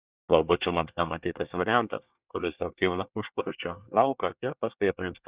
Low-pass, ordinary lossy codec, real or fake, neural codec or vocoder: 3.6 kHz; Opus, 64 kbps; fake; codec, 24 kHz, 1 kbps, SNAC